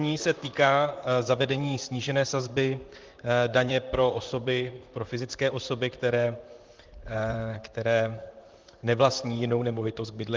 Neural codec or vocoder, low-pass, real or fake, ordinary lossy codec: vocoder, 44.1 kHz, 128 mel bands, Pupu-Vocoder; 7.2 kHz; fake; Opus, 24 kbps